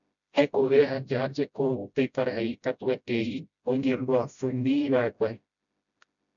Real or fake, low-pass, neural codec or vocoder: fake; 7.2 kHz; codec, 16 kHz, 0.5 kbps, FreqCodec, smaller model